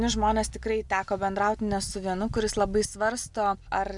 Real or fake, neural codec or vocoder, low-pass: real; none; 10.8 kHz